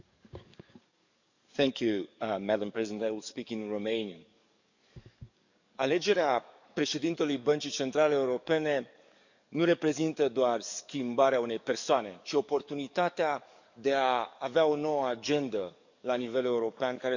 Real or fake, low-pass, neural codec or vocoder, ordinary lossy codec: fake; 7.2 kHz; codec, 44.1 kHz, 7.8 kbps, DAC; none